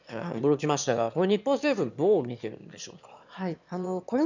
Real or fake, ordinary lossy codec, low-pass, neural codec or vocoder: fake; none; 7.2 kHz; autoencoder, 22.05 kHz, a latent of 192 numbers a frame, VITS, trained on one speaker